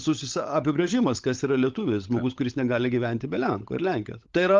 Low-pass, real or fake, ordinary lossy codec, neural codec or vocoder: 7.2 kHz; fake; Opus, 32 kbps; codec, 16 kHz, 16 kbps, FunCodec, trained on LibriTTS, 50 frames a second